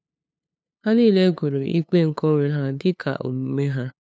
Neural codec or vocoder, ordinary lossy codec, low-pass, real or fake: codec, 16 kHz, 2 kbps, FunCodec, trained on LibriTTS, 25 frames a second; none; none; fake